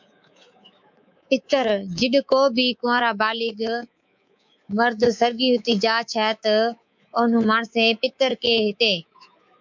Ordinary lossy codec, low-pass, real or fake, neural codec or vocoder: MP3, 64 kbps; 7.2 kHz; fake; codec, 24 kHz, 3.1 kbps, DualCodec